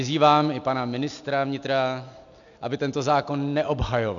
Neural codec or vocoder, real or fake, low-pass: none; real; 7.2 kHz